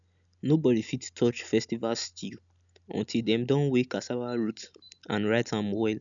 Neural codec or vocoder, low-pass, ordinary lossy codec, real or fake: none; 7.2 kHz; none; real